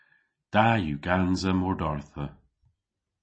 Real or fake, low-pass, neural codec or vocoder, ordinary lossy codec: real; 9.9 kHz; none; MP3, 32 kbps